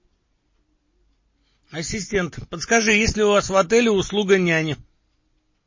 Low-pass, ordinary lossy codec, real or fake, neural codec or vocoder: 7.2 kHz; MP3, 32 kbps; real; none